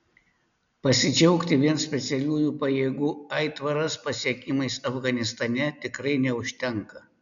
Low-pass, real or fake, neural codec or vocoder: 7.2 kHz; real; none